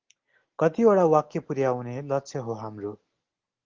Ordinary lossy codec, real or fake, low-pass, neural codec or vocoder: Opus, 16 kbps; real; 7.2 kHz; none